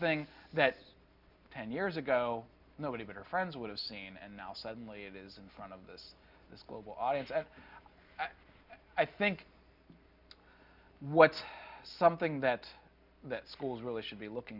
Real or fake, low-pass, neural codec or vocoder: real; 5.4 kHz; none